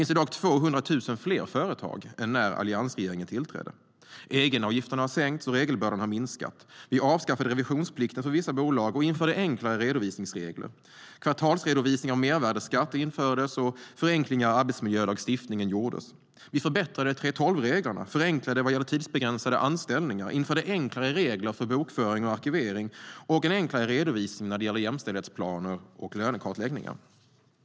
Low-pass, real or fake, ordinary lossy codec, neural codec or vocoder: none; real; none; none